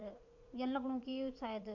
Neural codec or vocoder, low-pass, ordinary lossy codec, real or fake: vocoder, 44.1 kHz, 80 mel bands, Vocos; 7.2 kHz; none; fake